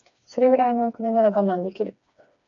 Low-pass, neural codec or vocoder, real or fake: 7.2 kHz; codec, 16 kHz, 2 kbps, FreqCodec, smaller model; fake